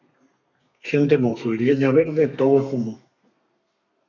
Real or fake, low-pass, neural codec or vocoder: fake; 7.2 kHz; codec, 32 kHz, 1.9 kbps, SNAC